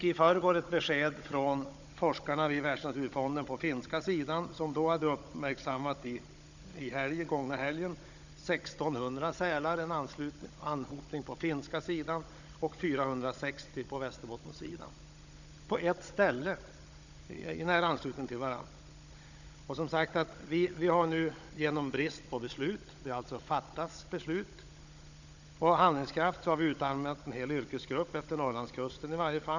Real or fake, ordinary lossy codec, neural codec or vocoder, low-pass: fake; none; codec, 16 kHz, 16 kbps, FunCodec, trained on Chinese and English, 50 frames a second; 7.2 kHz